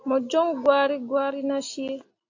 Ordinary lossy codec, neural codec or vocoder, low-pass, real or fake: AAC, 48 kbps; none; 7.2 kHz; real